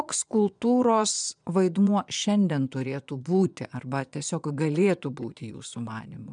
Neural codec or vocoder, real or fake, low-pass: vocoder, 22.05 kHz, 80 mel bands, Vocos; fake; 9.9 kHz